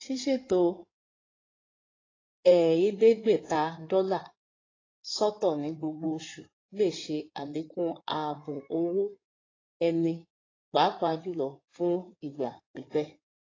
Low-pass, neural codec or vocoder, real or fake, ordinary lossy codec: 7.2 kHz; codec, 16 kHz in and 24 kHz out, 2.2 kbps, FireRedTTS-2 codec; fake; AAC, 32 kbps